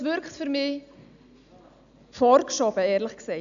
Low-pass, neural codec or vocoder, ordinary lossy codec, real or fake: 7.2 kHz; none; none; real